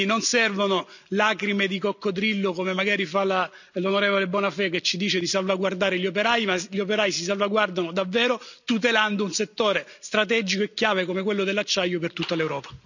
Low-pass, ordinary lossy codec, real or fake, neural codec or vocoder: 7.2 kHz; none; real; none